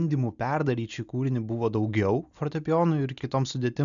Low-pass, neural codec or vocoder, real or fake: 7.2 kHz; none; real